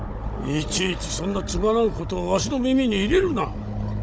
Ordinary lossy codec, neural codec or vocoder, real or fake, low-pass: none; codec, 16 kHz, 16 kbps, FunCodec, trained on Chinese and English, 50 frames a second; fake; none